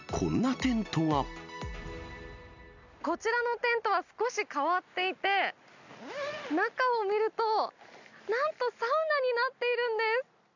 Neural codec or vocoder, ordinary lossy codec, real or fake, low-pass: none; none; real; 7.2 kHz